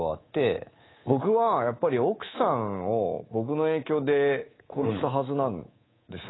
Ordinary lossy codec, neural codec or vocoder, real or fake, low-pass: AAC, 16 kbps; codec, 24 kHz, 3.1 kbps, DualCodec; fake; 7.2 kHz